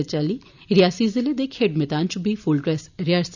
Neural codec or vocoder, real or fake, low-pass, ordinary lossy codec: none; real; 7.2 kHz; none